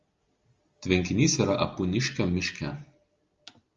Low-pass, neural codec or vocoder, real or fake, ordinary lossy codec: 7.2 kHz; none; real; Opus, 32 kbps